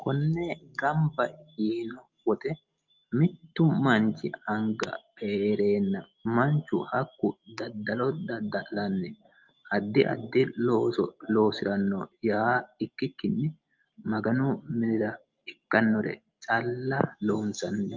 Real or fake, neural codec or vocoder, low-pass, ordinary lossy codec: real; none; 7.2 kHz; Opus, 24 kbps